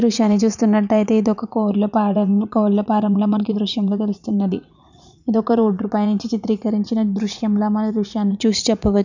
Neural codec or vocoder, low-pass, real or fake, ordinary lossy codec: vocoder, 44.1 kHz, 80 mel bands, Vocos; 7.2 kHz; fake; none